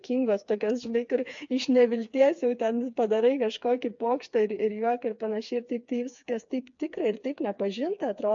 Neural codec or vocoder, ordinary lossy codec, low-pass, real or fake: codec, 16 kHz, 4 kbps, FreqCodec, smaller model; AAC, 64 kbps; 7.2 kHz; fake